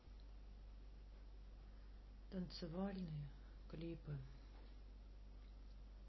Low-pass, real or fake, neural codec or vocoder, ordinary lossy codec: 7.2 kHz; real; none; MP3, 24 kbps